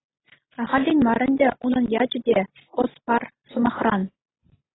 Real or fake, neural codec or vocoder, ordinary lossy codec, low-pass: real; none; AAC, 16 kbps; 7.2 kHz